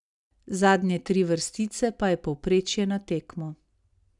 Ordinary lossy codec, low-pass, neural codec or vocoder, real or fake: none; 10.8 kHz; none; real